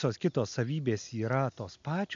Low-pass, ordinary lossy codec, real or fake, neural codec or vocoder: 7.2 kHz; MP3, 64 kbps; real; none